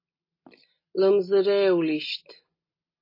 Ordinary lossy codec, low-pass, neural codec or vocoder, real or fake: MP3, 24 kbps; 5.4 kHz; none; real